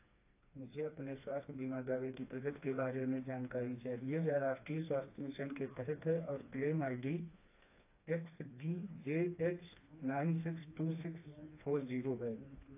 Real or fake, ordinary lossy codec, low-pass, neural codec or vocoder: fake; AAC, 24 kbps; 3.6 kHz; codec, 16 kHz, 2 kbps, FreqCodec, smaller model